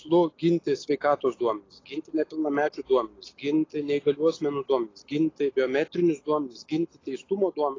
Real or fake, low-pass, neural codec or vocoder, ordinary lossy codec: real; 7.2 kHz; none; AAC, 32 kbps